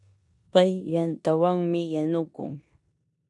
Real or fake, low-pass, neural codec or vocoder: fake; 10.8 kHz; codec, 16 kHz in and 24 kHz out, 0.9 kbps, LongCat-Audio-Codec, four codebook decoder